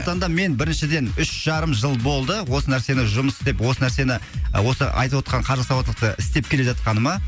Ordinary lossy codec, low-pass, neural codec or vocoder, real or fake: none; none; none; real